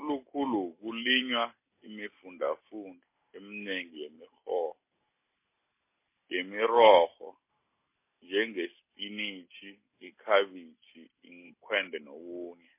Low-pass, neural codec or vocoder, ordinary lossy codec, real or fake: 3.6 kHz; none; MP3, 24 kbps; real